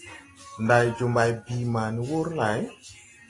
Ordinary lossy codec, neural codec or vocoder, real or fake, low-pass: AAC, 32 kbps; none; real; 10.8 kHz